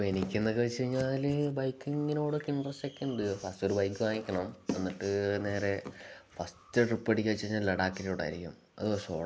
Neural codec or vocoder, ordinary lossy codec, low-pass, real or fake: none; none; none; real